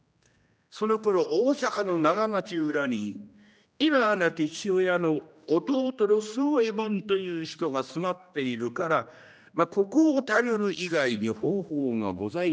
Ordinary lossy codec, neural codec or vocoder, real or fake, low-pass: none; codec, 16 kHz, 1 kbps, X-Codec, HuBERT features, trained on general audio; fake; none